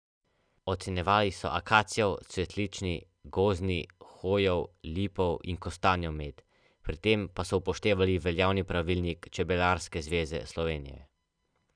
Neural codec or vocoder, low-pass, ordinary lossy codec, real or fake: none; 9.9 kHz; none; real